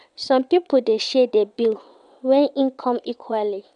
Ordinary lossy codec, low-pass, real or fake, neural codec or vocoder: none; 9.9 kHz; fake; vocoder, 22.05 kHz, 80 mel bands, WaveNeXt